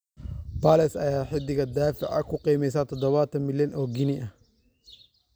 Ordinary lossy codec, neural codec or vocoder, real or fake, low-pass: none; none; real; none